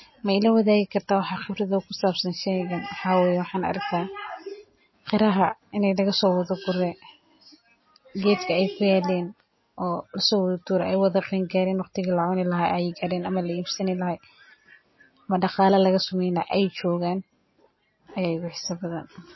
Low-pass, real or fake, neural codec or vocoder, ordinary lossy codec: 7.2 kHz; real; none; MP3, 24 kbps